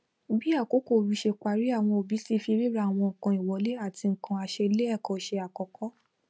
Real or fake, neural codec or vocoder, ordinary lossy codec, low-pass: real; none; none; none